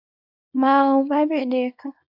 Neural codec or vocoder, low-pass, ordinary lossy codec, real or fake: codec, 24 kHz, 0.9 kbps, WavTokenizer, small release; 5.4 kHz; AAC, 48 kbps; fake